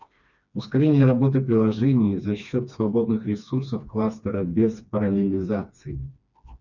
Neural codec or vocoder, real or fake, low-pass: codec, 16 kHz, 2 kbps, FreqCodec, smaller model; fake; 7.2 kHz